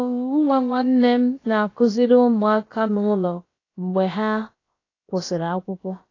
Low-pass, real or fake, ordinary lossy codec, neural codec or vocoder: 7.2 kHz; fake; AAC, 32 kbps; codec, 16 kHz, about 1 kbps, DyCAST, with the encoder's durations